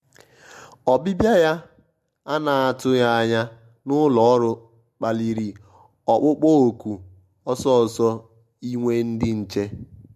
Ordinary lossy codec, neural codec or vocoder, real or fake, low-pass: MP3, 64 kbps; none; real; 14.4 kHz